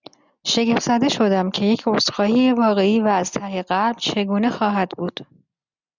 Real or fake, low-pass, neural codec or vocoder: real; 7.2 kHz; none